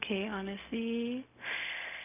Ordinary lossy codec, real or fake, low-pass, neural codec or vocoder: none; fake; 3.6 kHz; codec, 16 kHz, 0.4 kbps, LongCat-Audio-Codec